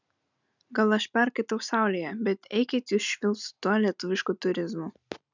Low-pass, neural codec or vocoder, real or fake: 7.2 kHz; none; real